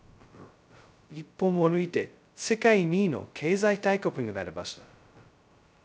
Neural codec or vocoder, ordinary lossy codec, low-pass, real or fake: codec, 16 kHz, 0.2 kbps, FocalCodec; none; none; fake